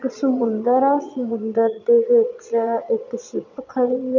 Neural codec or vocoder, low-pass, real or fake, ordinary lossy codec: vocoder, 22.05 kHz, 80 mel bands, Vocos; 7.2 kHz; fake; none